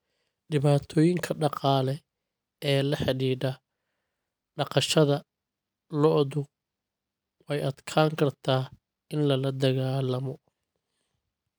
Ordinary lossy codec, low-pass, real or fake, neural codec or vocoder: none; none; real; none